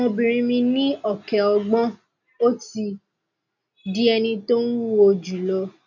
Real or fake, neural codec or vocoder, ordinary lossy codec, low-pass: real; none; none; 7.2 kHz